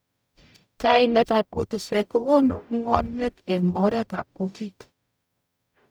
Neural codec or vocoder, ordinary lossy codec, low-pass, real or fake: codec, 44.1 kHz, 0.9 kbps, DAC; none; none; fake